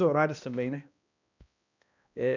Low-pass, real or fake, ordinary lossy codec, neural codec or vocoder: 7.2 kHz; fake; none; codec, 16 kHz, 1 kbps, X-Codec, HuBERT features, trained on balanced general audio